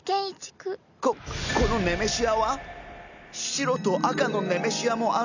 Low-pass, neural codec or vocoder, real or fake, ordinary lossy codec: 7.2 kHz; none; real; none